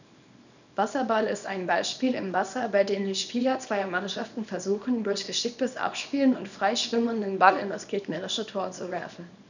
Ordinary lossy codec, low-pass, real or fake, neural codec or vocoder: none; 7.2 kHz; fake; codec, 24 kHz, 0.9 kbps, WavTokenizer, small release